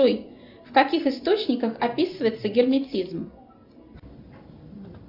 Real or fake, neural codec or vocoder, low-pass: real; none; 5.4 kHz